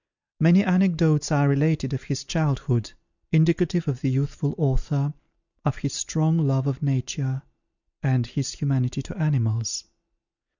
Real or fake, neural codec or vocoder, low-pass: real; none; 7.2 kHz